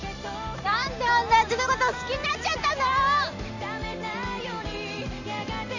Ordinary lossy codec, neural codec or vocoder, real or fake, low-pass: none; none; real; 7.2 kHz